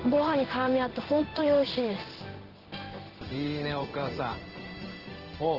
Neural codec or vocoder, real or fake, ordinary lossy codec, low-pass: codec, 16 kHz in and 24 kHz out, 1 kbps, XY-Tokenizer; fake; Opus, 16 kbps; 5.4 kHz